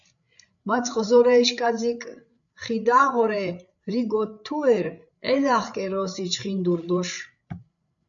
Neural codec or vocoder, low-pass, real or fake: codec, 16 kHz, 8 kbps, FreqCodec, larger model; 7.2 kHz; fake